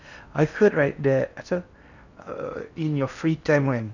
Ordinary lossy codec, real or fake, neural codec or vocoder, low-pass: none; fake; codec, 16 kHz in and 24 kHz out, 0.6 kbps, FocalCodec, streaming, 4096 codes; 7.2 kHz